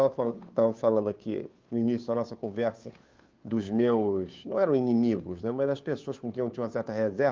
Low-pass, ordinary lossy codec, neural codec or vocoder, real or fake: 7.2 kHz; Opus, 32 kbps; codec, 16 kHz, 2 kbps, FunCodec, trained on Chinese and English, 25 frames a second; fake